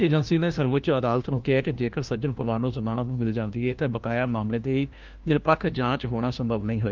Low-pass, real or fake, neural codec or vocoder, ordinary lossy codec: 7.2 kHz; fake; codec, 16 kHz, 1 kbps, FunCodec, trained on LibriTTS, 50 frames a second; Opus, 32 kbps